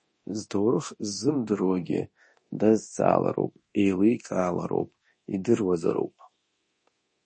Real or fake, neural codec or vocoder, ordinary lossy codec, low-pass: fake; codec, 24 kHz, 0.9 kbps, DualCodec; MP3, 32 kbps; 9.9 kHz